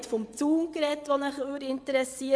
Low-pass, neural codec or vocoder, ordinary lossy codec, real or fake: none; vocoder, 22.05 kHz, 80 mel bands, WaveNeXt; none; fake